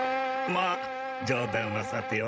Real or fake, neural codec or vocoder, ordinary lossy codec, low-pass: fake; codec, 16 kHz, 16 kbps, FreqCodec, larger model; none; none